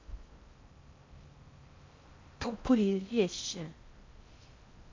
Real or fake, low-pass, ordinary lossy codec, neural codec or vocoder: fake; 7.2 kHz; MP3, 48 kbps; codec, 16 kHz in and 24 kHz out, 0.6 kbps, FocalCodec, streaming, 4096 codes